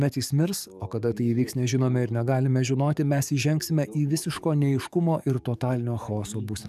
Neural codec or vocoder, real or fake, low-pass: codec, 44.1 kHz, 7.8 kbps, DAC; fake; 14.4 kHz